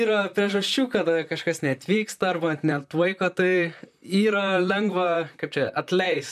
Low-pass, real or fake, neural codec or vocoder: 14.4 kHz; fake; vocoder, 44.1 kHz, 128 mel bands, Pupu-Vocoder